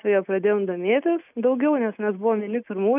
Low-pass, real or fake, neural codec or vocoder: 3.6 kHz; real; none